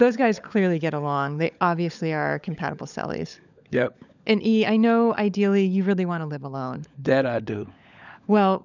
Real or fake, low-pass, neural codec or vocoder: fake; 7.2 kHz; codec, 16 kHz, 16 kbps, FunCodec, trained on LibriTTS, 50 frames a second